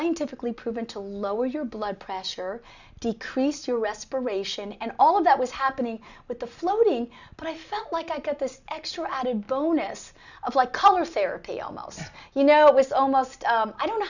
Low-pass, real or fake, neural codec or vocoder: 7.2 kHz; real; none